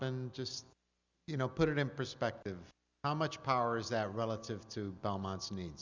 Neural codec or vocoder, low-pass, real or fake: none; 7.2 kHz; real